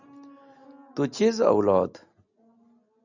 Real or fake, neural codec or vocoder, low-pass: real; none; 7.2 kHz